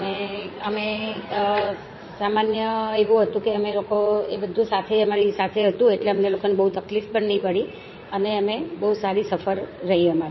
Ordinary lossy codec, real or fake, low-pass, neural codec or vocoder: MP3, 24 kbps; fake; 7.2 kHz; vocoder, 44.1 kHz, 80 mel bands, Vocos